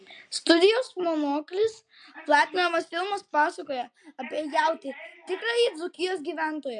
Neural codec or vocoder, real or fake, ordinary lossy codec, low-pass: none; real; MP3, 64 kbps; 9.9 kHz